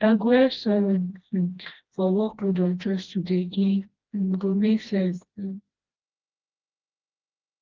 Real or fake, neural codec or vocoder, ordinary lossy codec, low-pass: fake; codec, 16 kHz, 1 kbps, FreqCodec, smaller model; Opus, 32 kbps; 7.2 kHz